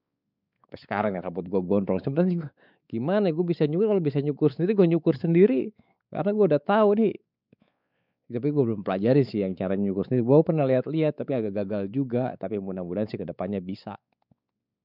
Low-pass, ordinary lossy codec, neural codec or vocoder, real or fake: 5.4 kHz; none; codec, 16 kHz, 4 kbps, X-Codec, WavLM features, trained on Multilingual LibriSpeech; fake